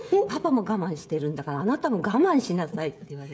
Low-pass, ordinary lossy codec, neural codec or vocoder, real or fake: none; none; codec, 16 kHz, 16 kbps, FreqCodec, smaller model; fake